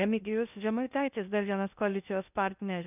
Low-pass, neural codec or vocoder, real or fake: 3.6 kHz; codec, 16 kHz in and 24 kHz out, 0.6 kbps, FocalCodec, streaming, 2048 codes; fake